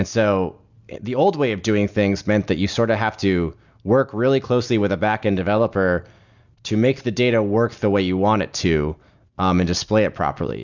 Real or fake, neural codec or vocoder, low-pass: real; none; 7.2 kHz